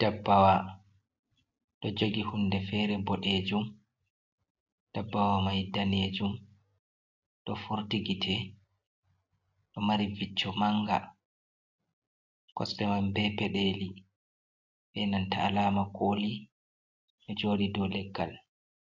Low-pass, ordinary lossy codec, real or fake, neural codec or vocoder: 7.2 kHz; AAC, 48 kbps; real; none